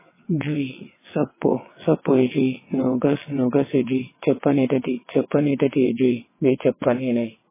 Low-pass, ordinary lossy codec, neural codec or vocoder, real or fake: 3.6 kHz; MP3, 16 kbps; vocoder, 22.05 kHz, 80 mel bands, WaveNeXt; fake